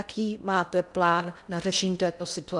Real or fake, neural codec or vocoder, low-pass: fake; codec, 16 kHz in and 24 kHz out, 0.8 kbps, FocalCodec, streaming, 65536 codes; 10.8 kHz